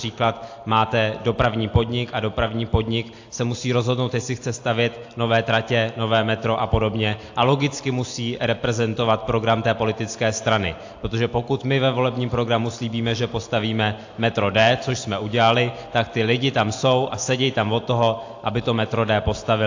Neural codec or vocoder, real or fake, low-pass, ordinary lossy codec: none; real; 7.2 kHz; AAC, 48 kbps